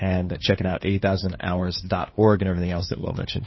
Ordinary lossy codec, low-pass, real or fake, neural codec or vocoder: MP3, 24 kbps; 7.2 kHz; fake; codec, 16 kHz, 2 kbps, FunCodec, trained on LibriTTS, 25 frames a second